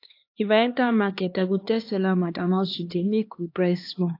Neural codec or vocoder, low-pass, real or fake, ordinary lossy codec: codec, 16 kHz, 2 kbps, X-Codec, HuBERT features, trained on LibriSpeech; 5.4 kHz; fake; AAC, 32 kbps